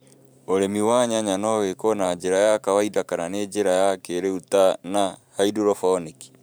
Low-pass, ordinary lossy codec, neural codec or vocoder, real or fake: none; none; vocoder, 44.1 kHz, 128 mel bands every 512 samples, BigVGAN v2; fake